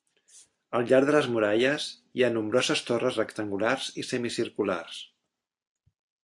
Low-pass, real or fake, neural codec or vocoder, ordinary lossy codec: 10.8 kHz; real; none; AAC, 64 kbps